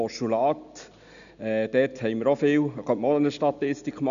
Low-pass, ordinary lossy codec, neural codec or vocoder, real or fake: 7.2 kHz; none; none; real